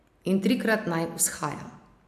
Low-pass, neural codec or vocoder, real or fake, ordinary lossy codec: 14.4 kHz; none; real; AAC, 96 kbps